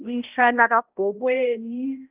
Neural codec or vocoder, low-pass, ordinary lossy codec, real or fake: codec, 16 kHz, 0.5 kbps, X-Codec, HuBERT features, trained on balanced general audio; 3.6 kHz; Opus, 32 kbps; fake